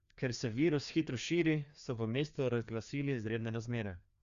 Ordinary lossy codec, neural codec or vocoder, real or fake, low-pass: Opus, 64 kbps; codec, 16 kHz, 2 kbps, FreqCodec, larger model; fake; 7.2 kHz